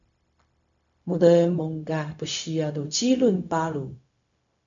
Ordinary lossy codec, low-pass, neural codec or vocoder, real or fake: MP3, 96 kbps; 7.2 kHz; codec, 16 kHz, 0.4 kbps, LongCat-Audio-Codec; fake